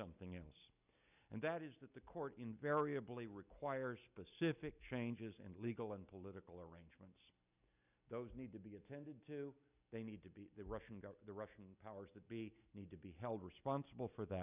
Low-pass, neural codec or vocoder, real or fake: 3.6 kHz; none; real